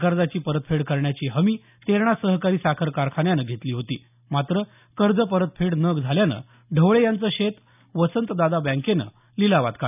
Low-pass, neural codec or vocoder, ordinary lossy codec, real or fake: 3.6 kHz; none; none; real